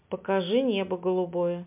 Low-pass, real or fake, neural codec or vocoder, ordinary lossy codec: 3.6 kHz; real; none; MP3, 32 kbps